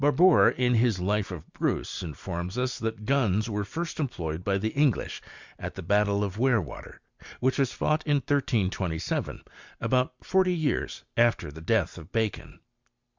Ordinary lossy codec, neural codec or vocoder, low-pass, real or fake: Opus, 64 kbps; none; 7.2 kHz; real